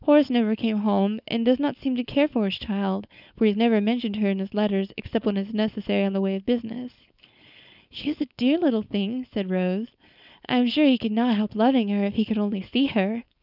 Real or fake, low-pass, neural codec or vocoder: fake; 5.4 kHz; codec, 16 kHz, 4.8 kbps, FACodec